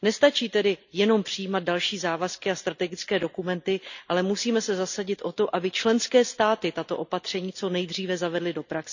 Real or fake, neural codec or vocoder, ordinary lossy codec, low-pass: real; none; none; 7.2 kHz